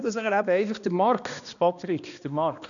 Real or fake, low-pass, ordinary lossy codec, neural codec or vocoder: fake; 7.2 kHz; none; codec, 16 kHz, 1 kbps, X-Codec, HuBERT features, trained on balanced general audio